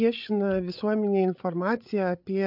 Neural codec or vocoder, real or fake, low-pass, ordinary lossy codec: codec, 16 kHz, 8 kbps, FreqCodec, larger model; fake; 5.4 kHz; MP3, 48 kbps